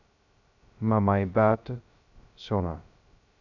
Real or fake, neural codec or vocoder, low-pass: fake; codec, 16 kHz, 0.2 kbps, FocalCodec; 7.2 kHz